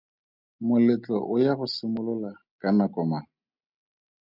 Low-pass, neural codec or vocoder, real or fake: 5.4 kHz; none; real